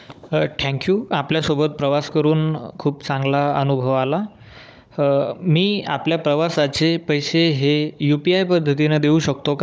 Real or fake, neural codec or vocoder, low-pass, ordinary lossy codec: fake; codec, 16 kHz, 16 kbps, FunCodec, trained on Chinese and English, 50 frames a second; none; none